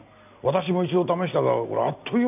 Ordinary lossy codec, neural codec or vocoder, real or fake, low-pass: none; none; real; 3.6 kHz